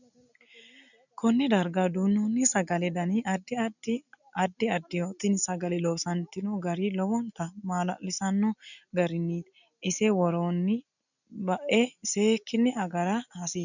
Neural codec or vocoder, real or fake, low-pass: none; real; 7.2 kHz